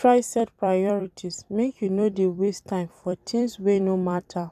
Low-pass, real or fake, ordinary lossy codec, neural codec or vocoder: 14.4 kHz; fake; none; vocoder, 44.1 kHz, 128 mel bands every 256 samples, BigVGAN v2